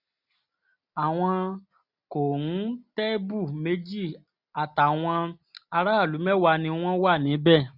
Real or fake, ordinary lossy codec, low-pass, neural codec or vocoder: real; none; 5.4 kHz; none